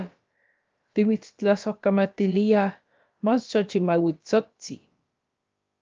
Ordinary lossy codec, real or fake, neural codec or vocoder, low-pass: Opus, 24 kbps; fake; codec, 16 kHz, about 1 kbps, DyCAST, with the encoder's durations; 7.2 kHz